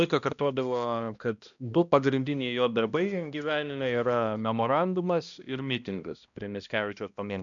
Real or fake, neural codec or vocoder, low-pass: fake; codec, 16 kHz, 1 kbps, X-Codec, HuBERT features, trained on balanced general audio; 7.2 kHz